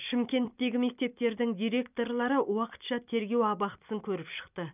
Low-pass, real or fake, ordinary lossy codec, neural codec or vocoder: 3.6 kHz; fake; none; vocoder, 44.1 kHz, 128 mel bands every 256 samples, BigVGAN v2